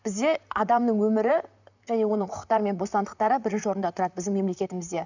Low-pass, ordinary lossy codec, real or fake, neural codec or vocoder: 7.2 kHz; none; fake; vocoder, 44.1 kHz, 128 mel bands, Pupu-Vocoder